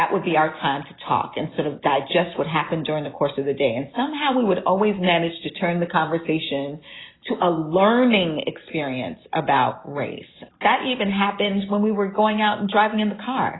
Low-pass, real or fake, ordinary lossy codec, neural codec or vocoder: 7.2 kHz; real; AAC, 16 kbps; none